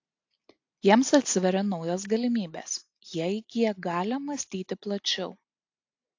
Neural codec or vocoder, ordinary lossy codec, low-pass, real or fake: none; AAC, 48 kbps; 7.2 kHz; real